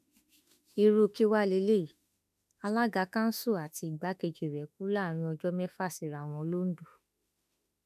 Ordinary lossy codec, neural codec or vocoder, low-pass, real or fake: none; autoencoder, 48 kHz, 32 numbers a frame, DAC-VAE, trained on Japanese speech; 14.4 kHz; fake